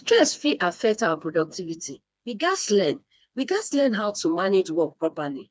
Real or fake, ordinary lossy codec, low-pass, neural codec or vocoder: fake; none; none; codec, 16 kHz, 2 kbps, FreqCodec, smaller model